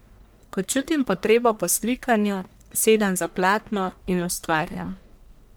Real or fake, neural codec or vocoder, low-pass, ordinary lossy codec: fake; codec, 44.1 kHz, 1.7 kbps, Pupu-Codec; none; none